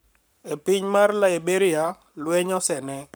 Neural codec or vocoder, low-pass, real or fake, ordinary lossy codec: codec, 44.1 kHz, 7.8 kbps, Pupu-Codec; none; fake; none